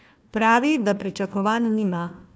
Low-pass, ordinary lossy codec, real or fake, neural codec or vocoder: none; none; fake; codec, 16 kHz, 1 kbps, FunCodec, trained on Chinese and English, 50 frames a second